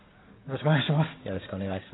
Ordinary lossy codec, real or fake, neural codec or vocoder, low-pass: AAC, 16 kbps; real; none; 7.2 kHz